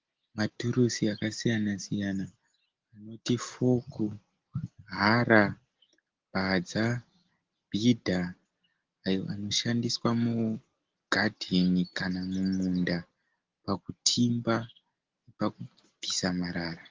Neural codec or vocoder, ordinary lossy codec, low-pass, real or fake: none; Opus, 16 kbps; 7.2 kHz; real